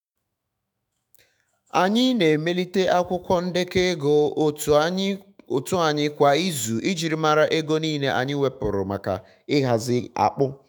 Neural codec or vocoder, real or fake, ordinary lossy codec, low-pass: autoencoder, 48 kHz, 128 numbers a frame, DAC-VAE, trained on Japanese speech; fake; none; none